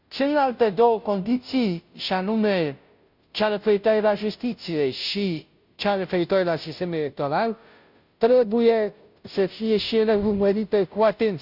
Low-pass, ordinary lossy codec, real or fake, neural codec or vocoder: 5.4 kHz; none; fake; codec, 16 kHz, 0.5 kbps, FunCodec, trained on Chinese and English, 25 frames a second